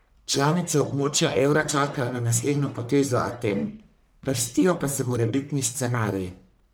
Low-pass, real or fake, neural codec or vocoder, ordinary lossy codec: none; fake; codec, 44.1 kHz, 1.7 kbps, Pupu-Codec; none